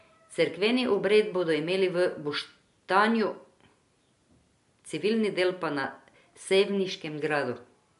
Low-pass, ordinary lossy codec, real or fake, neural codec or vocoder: 10.8 kHz; MP3, 64 kbps; real; none